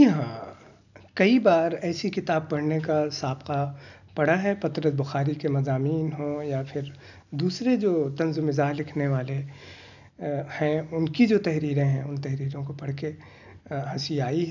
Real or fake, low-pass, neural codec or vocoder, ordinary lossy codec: real; 7.2 kHz; none; none